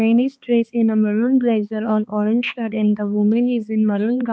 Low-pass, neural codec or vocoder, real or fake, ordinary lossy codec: none; codec, 16 kHz, 2 kbps, X-Codec, HuBERT features, trained on balanced general audio; fake; none